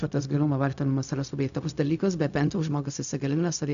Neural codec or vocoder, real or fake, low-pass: codec, 16 kHz, 0.4 kbps, LongCat-Audio-Codec; fake; 7.2 kHz